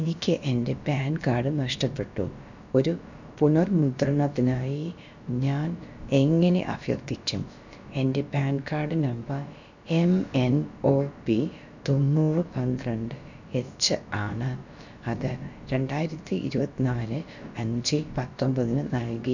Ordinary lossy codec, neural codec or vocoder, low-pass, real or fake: none; codec, 16 kHz, about 1 kbps, DyCAST, with the encoder's durations; 7.2 kHz; fake